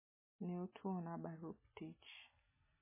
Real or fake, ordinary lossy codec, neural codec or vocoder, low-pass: real; MP3, 16 kbps; none; 3.6 kHz